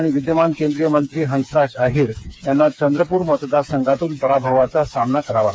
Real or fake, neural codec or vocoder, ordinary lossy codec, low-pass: fake; codec, 16 kHz, 4 kbps, FreqCodec, smaller model; none; none